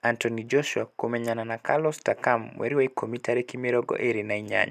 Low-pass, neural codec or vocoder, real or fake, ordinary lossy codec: 14.4 kHz; none; real; none